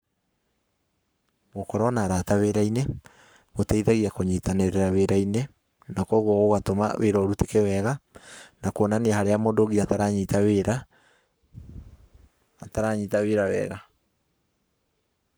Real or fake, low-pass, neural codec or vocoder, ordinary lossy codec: fake; none; codec, 44.1 kHz, 7.8 kbps, Pupu-Codec; none